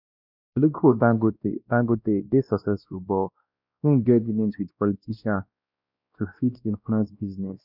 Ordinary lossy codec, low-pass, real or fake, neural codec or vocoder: none; 5.4 kHz; fake; codec, 16 kHz, 1 kbps, X-Codec, WavLM features, trained on Multilingual LibriSpeech